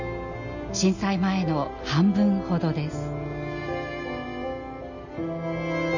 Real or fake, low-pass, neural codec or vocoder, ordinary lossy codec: real; 7.2 kHz; none; none